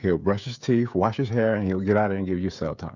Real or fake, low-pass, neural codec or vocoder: fake; 7.2 kHz; codec, 16 kHz, 16 kbps, FreqCodec, smaller model